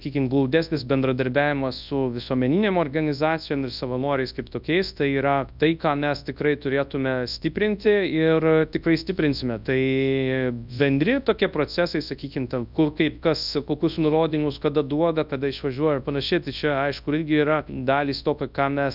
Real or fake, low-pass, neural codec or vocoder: fake; 5.4 kHz; codec, 24 kHz, 0.9 kbps, WavTokenizer, large speech release